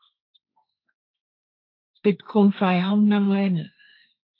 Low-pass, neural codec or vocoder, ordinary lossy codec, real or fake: 5.4 kHz; codec, 16 kHz, 1.1 kbps, Voila-Tokenizer; AAC, 32 kbps; fake